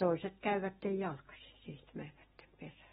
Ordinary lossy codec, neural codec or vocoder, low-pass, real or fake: AAC, 16 kbps; none; 19.8 kHz; real